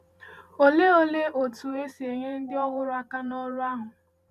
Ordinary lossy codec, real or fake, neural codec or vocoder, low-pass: none; fake; vocoder, 44.1 kHz, 128 mel bands every 512 samples, BigVGAN v2; 14.4 kHz